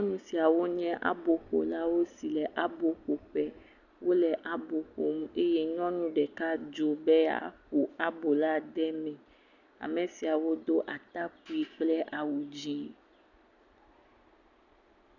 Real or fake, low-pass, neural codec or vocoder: real; 7.2 kHz; none